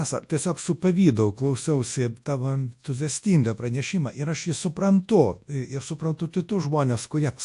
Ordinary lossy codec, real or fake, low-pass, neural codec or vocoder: AAC, 64 kbps; fake; 10.8 kHz; codec, 24 kHz, 0.9 kbps, WavTokenizer, large speech release